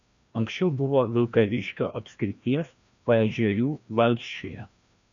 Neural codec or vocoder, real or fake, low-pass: codec, 16 kHz, 1 kbps, FreqCodec, larger model; fake; 7.2 kHz